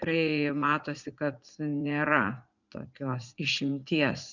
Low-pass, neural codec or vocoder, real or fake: 7.2 kHz; vocoder, 44.1 kHz, 80 mel bands, Vocos; fake